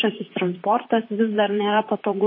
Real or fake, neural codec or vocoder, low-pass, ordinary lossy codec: fake; vocoder, 44.1 kHz, 128 mel bands, Pupu-Vocoder; 5.4 kHz; MP3, 24 kbps